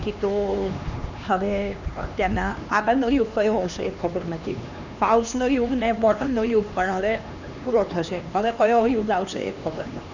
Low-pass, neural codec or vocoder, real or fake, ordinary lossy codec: 7.2 kHz; codec, 16 kHz, 2 kbps, X-Codec, HuBERT features, trained on LibriSpeech; fake; none